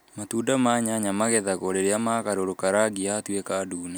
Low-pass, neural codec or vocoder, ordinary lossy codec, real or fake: none; none; none; real